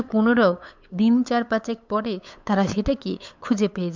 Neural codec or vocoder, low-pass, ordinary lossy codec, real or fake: codec, 16 kHz, 8 kbps, FunCodec, trained on LibriTTS, 25 frames a second; 7.2 kHz; MP3, 64 kbps; fake